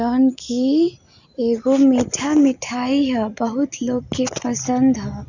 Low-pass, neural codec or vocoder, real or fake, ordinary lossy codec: 7.2 kHz; none; real; none